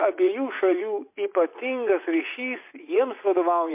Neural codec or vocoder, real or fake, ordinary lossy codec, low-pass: vocoder, 44.1 kHz, 80 mel bands, Vocos; fake; MP3, 24 kbps; 3.6 kHz